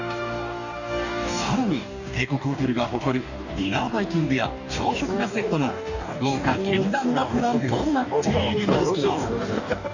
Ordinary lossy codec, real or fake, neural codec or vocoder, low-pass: none; fake; codec, 44.1 kHz, 2.6 kbps, DAC; 7.2 kHz